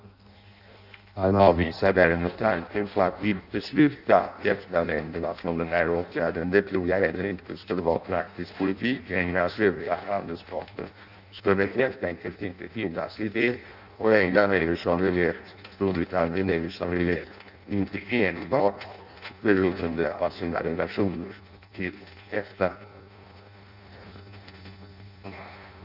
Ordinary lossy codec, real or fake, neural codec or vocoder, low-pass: none; fake; codec, 16 kHz in and 24 kHz out, 0.6 kbps, FireRedTTS-2 codec; 5.4 kHz